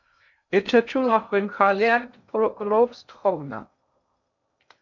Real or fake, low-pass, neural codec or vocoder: fake; 7.2 kHz; codec, 16 kHz in and 24 kHz out, 0.6 kbps, FocalCodec, streaming, 2048 codes